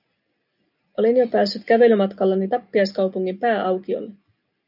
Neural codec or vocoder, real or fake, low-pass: none; real; 5.4 kHz